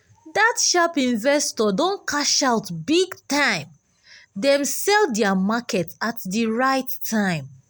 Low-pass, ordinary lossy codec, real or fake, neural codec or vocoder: none; none; real; none